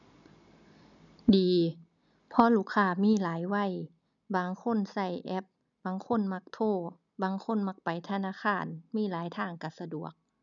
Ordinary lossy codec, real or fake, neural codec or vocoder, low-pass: none; real; none; 7.2 kHz